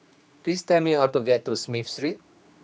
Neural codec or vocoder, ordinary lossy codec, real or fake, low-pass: codec, 16 kHz, 2 kbps, X-Codec, HuBERT features, trained on general audio; none; fake; none